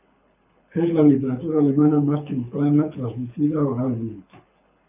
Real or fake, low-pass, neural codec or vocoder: fake; 3.6 kHz; codec, 24 kHz, 6 kbps, HILCodec